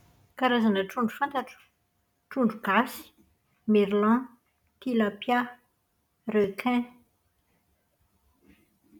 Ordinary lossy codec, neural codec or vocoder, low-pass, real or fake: none; none; 19.8 kHz; real